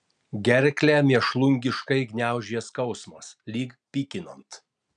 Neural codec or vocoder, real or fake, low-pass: none; real; 9.9 kHz